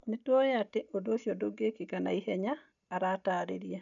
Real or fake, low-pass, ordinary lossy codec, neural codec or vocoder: real; 7.2 kHz; none; none